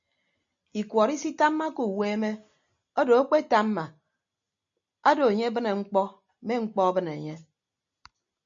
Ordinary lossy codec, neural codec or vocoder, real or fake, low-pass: MP3, 64 kbps; none; real; 7.2 kHz